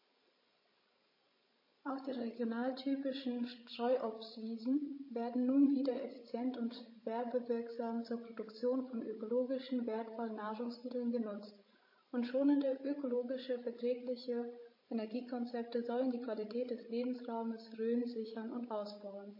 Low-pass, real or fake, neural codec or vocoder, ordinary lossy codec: 5.4 kHz; fake; codec, 16 kHz, 16 kbps, FreqCodec, larger model; MP3, 24 kbps